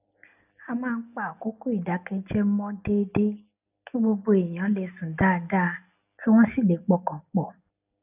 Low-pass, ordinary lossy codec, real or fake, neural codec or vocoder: 3.6 kHz; none; real; none